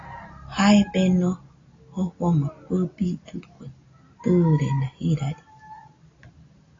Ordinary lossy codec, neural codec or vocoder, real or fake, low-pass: AAC, 32 kbps; none; real; 7.2 kHz